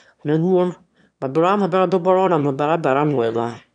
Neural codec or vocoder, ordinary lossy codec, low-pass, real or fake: autoencoder, 22.05 kHz, a latent of 192 numbers a frame, VITS, trained on one speaker; none; 9.9 kHz; fake